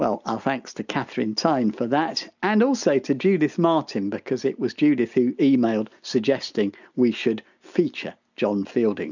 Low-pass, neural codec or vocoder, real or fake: 7.2 kHz; none; real